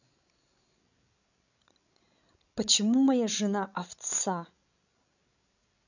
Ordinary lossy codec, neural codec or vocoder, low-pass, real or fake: none; codec, 16 kHz, 16 kbps, FreqCodec, larger model; 7.2 kHz; fake